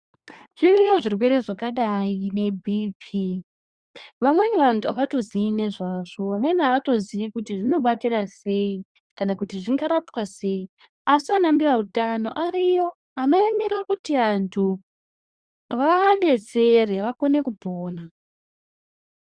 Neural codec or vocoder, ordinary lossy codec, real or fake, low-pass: codec, 24 kHz, 1 kbps, SNAC; Opus, 64 kbps; fake; 9.9 kHz